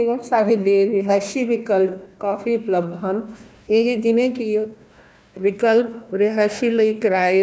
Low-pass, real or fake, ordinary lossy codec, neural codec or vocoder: none; fake; none; codec, 16 kHz, 1 kbps, FunCodec, trained on Chinese and English, 50 frames a second